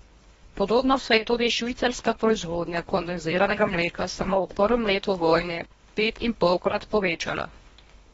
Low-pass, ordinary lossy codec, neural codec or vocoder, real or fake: 10.8 kHz; AAC, 24 kbps; codec, 24 kHz, 1.5 kbps, HILCodec; fake